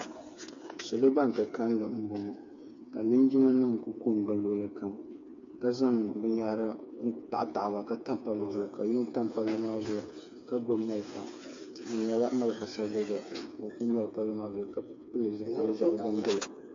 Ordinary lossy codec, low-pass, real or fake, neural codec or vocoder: MP3, 48 kbps; 7.2 kHz; fake; codec, 16 kHz, 4 kbps, FreqCodec, smaller model